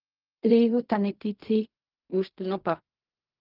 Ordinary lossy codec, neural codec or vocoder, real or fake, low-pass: Opus, 24 kbps; codec, 16 kHz in and 24 kHz out, 0.4 kbps, LongCat-Audio-Codec, fine tuned four codebook decoder; fake; 5.4 kHz